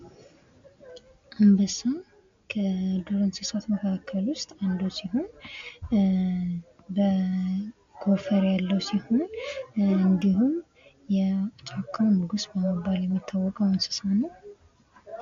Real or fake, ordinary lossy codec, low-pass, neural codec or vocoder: real; AAC, 48 kbps; 7.2 kHz; none